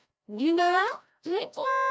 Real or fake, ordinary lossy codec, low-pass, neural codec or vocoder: fake; none; none; codec, 16 kHz, 0.5 kbps, FreqCodec, larger model